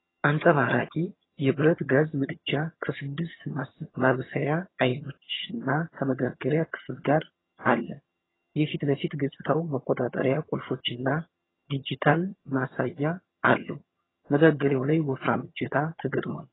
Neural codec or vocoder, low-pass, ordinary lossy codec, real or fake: vocoder, 22.05 kHz, 80 mel bands, HiFi-GAN; 7.2 kHz; AAC, 16 kbps; fake